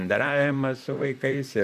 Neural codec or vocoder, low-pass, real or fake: vocoder, 44.1 kHz, 128 mel bands, Pupu-Vocoder; 14.4 kHz; fake